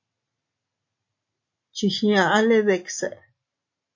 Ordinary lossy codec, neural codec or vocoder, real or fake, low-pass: AAC, 48 kbps; none; real; 7.2 kHz